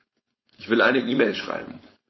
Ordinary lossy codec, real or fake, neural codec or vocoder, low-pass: MP3, 24 kbps; fake; codec, 16 kHz, 4.8 kbps, FACodec; 7.2 kHz